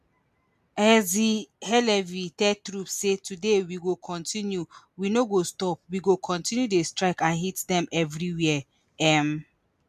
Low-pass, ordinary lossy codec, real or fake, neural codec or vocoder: 14.4 kHz; MP3, 96 kbps; real; none